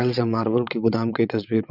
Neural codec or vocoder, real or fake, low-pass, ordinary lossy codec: vocoder, 22.05 kHz, 80 mel bands, WaveNeXt; fake; 5.4 kHz; none